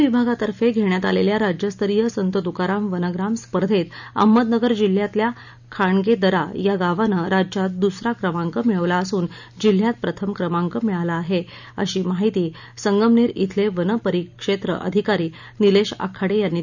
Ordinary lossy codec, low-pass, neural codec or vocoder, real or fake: none; 7.2 kHz; none; real